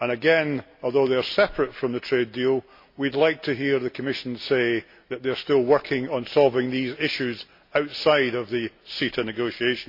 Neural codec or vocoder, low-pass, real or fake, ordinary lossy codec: none; 5.4 kHz; real; MP3, 32 kbps